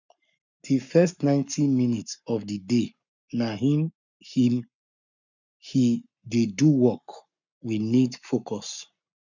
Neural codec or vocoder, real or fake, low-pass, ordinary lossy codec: codec, 44.1 kHz, 7.8 kbps, Pupu-Codec; fake; 7.2 kHz; none